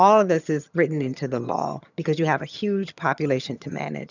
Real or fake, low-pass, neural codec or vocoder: fake; 7.2 kHz; vocoder, 22.05 kHz, 80 mel bands, HiFi-GAN